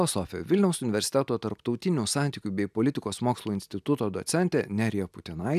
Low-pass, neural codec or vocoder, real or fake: 14.4 kHz; none; real